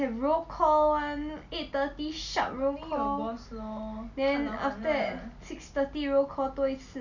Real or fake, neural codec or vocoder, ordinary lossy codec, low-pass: real; none; none; 7.2 kHz